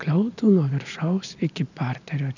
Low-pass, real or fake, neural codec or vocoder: 7.2 kHz; real; none